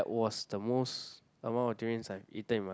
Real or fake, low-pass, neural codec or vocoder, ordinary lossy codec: real; none; none; none